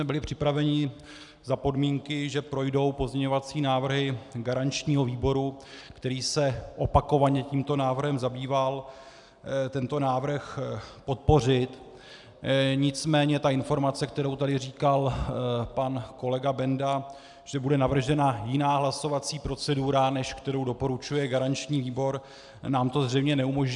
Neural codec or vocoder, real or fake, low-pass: none; real; 10.8 kHz